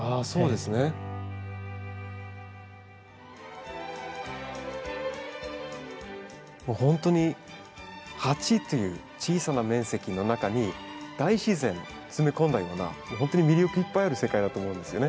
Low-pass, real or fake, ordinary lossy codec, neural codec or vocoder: none; real; none; none